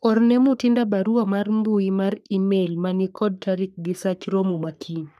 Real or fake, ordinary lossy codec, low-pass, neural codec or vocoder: fake; none; 14.4 kHz; codec, 44.1 kHz, 3.4 kbps, Pupu-Codec